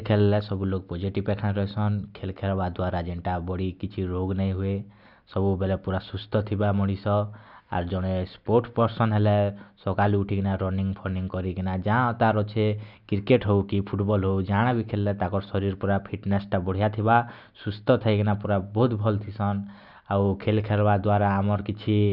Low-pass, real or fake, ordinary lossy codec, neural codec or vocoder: 5.4 kHz; real; none; none